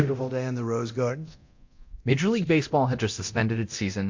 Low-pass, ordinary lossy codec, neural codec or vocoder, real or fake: 7.2 kHz; MP3, 48 kbps; codec, 24 kHz, 0.9 kbps, DualCodec; fake